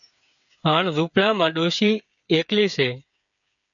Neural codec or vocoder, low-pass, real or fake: codec, 16 kHz, 8 kbps, FreqCodec, smaller model; 7.2 kHz; fake